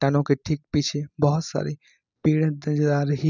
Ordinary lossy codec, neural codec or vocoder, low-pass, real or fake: none; none; 7.2 kHz; real